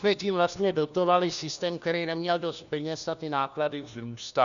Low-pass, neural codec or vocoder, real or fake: 7.2 kHz; codec, 16 kHz, 1 kbps, FunCodec, trained on LibriTTS, 50 frames a second; fake